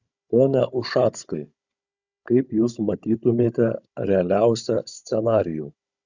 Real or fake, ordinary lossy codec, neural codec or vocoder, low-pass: fake; Opus, 64 kbps; codec, 16 kHz, 16 kbps, FunCodec, trained on Chinese and English, 50 frames a second; 7.2 kHz